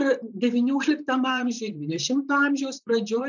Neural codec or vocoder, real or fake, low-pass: vocoder, 44.1 kHz, 128 mel bands, Pupu-Vocoder; fake; 7.2 kHz